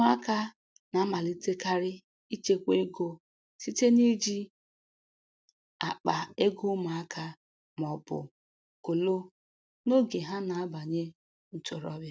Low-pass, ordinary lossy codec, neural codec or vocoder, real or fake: none; none; none; real